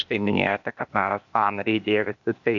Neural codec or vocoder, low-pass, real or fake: codec, 16 kHz, 0.8 kbps, ZipCodec; 7.2 kHz; fake